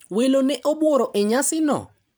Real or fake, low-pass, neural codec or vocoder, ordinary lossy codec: fake; none; vocoder, 44.1 kHz, 128 mel bands every 512 samples, BigVGAN v2; none